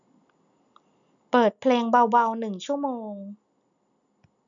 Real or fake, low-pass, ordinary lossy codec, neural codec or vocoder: real; 7.2 kHz; none; none